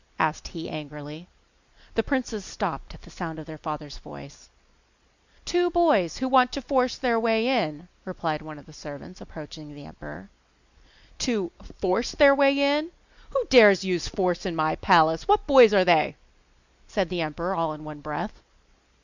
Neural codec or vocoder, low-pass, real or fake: none; 7.2 kHz; real